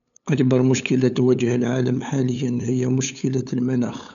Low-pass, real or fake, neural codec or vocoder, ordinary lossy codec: 7.2 kHz; fake; codec, 16 kHz, 8 kbps, FunCodec, trained on LibriTTS, 25 frames a second; none